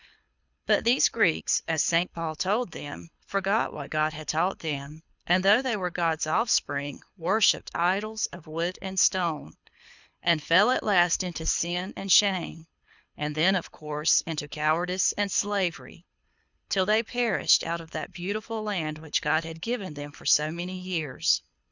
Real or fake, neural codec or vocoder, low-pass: fake; codec, 24 kHz, 6 kbps, HILCodec; 7.2 kHz